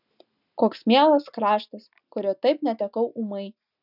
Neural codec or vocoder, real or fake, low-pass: none; real; 5.4 kHz